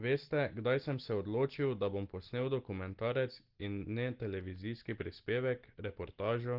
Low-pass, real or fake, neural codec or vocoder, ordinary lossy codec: 5.4 kHz; real; none; Opus, 16 kbps